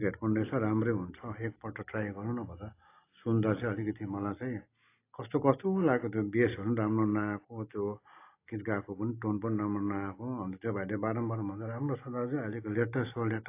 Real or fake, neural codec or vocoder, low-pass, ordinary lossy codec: real; none; 3.6 kHz; AAC, 24 kbps